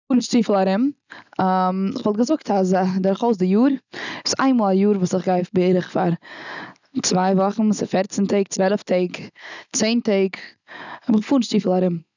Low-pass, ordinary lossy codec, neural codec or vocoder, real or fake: 7.2 kHz; none; none; real